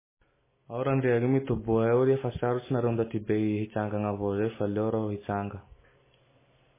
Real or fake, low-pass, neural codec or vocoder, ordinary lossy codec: real; 3.6 kHz; none; MP3, 16 kbps